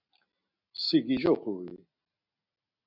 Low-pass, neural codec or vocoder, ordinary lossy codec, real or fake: 5.4 kHz; none; MP3, 48 kbps; real